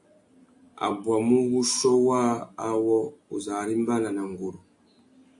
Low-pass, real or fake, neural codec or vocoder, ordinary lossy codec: 10.8 kHz; real; none; AAC, 64 kbps